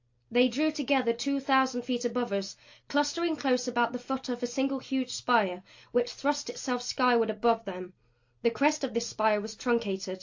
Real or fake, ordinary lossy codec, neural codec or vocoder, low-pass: real; AAC, 48 kbps; none; 7.2 kHz